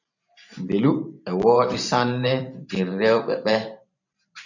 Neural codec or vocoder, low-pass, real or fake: vocoder, 44.1 kHz, 128 mel bands every 512 samples, BigVGAN v2; 7.2 kHz; fake